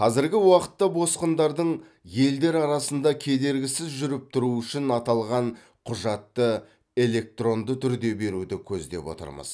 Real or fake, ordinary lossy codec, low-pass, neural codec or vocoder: real; none; none; none